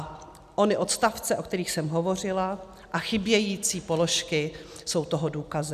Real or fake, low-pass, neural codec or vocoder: real; 14.4 kHz; none